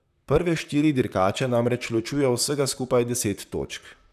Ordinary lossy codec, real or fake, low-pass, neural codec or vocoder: none; fake; 14.4 kHz; vocoder, 44.1 kHz, 128 mel bands, Pupu-Vocoder